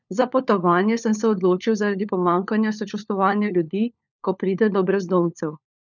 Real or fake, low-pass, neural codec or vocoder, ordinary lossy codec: fake; 7.2 kHz; codec, 16 kHz, 4 kbps, FunCodec, trained on LibriTTS, 50 frames a second; none